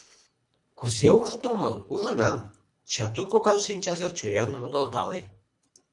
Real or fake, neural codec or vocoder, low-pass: fake; codec, 24 kHz, 1.5 kbps, HILCodec; 10.8 kHz